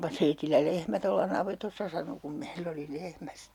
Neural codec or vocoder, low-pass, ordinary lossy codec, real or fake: none; 19.8 kHz; none; real